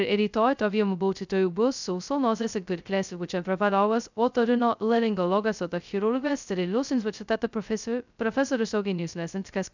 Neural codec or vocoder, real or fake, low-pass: codec, 16 kHz, 0.2 kbps, FocalCodec; fake; 7.2 kHz